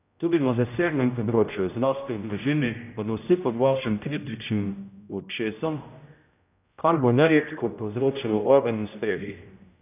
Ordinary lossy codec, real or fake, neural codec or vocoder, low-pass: none; fake; codec, 16 kHz, 0.5 kbps, X-Codec, HuBERT features, trained on general audio; 3.6 kHz